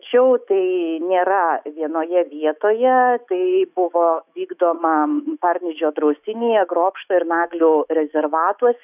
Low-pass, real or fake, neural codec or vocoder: 3.6 kHz; fake; autoencoder, 48 kHz, 128 numbers a frame, DAC-VAE, trained on Japanese speech